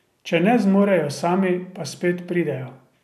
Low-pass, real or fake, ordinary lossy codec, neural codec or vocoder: 14.4 kHz; real; none; none